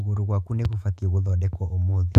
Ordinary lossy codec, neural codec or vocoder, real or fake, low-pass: AAC, 96 kbps; autoencoder, 48 kHz, 128 numbers a frame, DAC-VAE, trained on Japanese speech; fake; 14.4 kHz